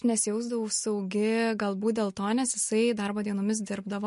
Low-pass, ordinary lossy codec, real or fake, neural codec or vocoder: 10.8 kHz; MP3, 48 kbps; real; none